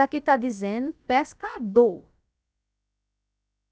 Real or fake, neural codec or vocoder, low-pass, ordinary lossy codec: fake; codec, 16 kHz, about 1 kbps, DyCAST, with the encoder's durations; none; none